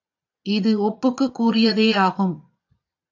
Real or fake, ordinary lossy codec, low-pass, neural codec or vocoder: fake; AAC, 48 kbps; 7.2 kHz; vocoder, 22.05 kHz, 80 mel bands, Vocos